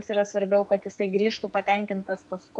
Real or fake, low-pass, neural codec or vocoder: fake; 10.8 kHz; codec, 44.1 kHz, 2.6 kbps, SNAC